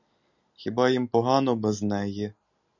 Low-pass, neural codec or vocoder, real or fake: 7.2 kHz; none; real